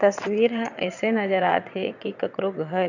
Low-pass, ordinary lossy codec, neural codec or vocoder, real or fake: 7.2 kHz; none; vocoder, 22.05 kHz, 80 mel bands, WaveNeXt; fake